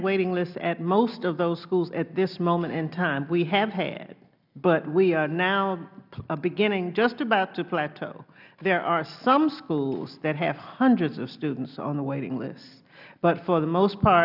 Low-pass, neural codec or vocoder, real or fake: 5.4 kHz; none; real